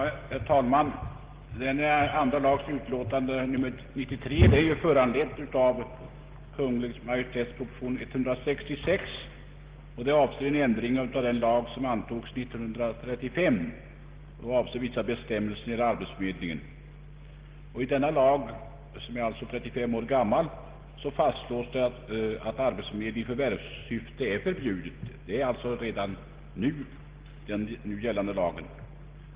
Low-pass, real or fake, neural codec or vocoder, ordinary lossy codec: 3.6 kHz; real; none; Opus, 16 kbps